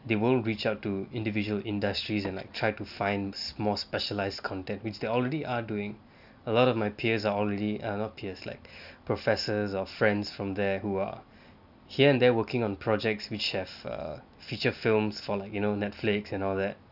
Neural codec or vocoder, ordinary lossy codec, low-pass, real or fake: none; none; 5.4 kHz; real